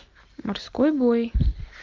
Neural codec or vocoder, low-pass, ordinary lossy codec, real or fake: none; 7.2 kHz; Opus, 32 kbps; real